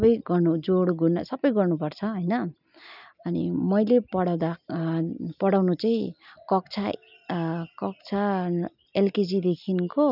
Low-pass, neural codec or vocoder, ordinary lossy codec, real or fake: 5.4 kHz; none; none; real